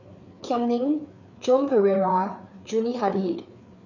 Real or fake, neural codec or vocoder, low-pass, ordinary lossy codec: fake; codec, 16 kHz, 4 kbps, FreqCodec, larger model; 7.2 kHz; none